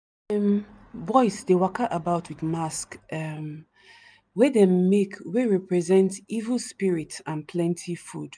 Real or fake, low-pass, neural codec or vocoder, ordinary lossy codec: real; 9.9 kHz; none; none